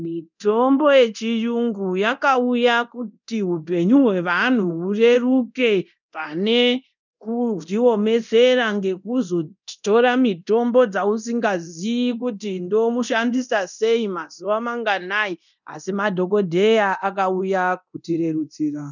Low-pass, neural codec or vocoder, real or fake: 7.2 kHz; codec, 24 kHz, 0.9 kbps, DualCodec; fake